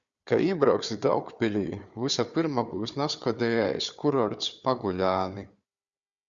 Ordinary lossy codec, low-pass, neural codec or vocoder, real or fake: Opus, 64 kbps; 7.2 kHz; codec, 16 kHz, 4 kbps, FunCodec, trained on Chinese and English, 50 frames a second; fake